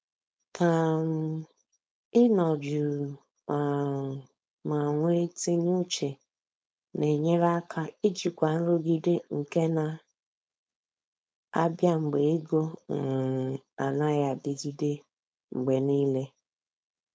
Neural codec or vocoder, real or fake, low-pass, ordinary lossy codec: codec, 16 kHz, 4.8 kbps, FACodec; fake; none; none